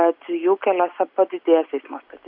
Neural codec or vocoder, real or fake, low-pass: none; real; 5.4 kHz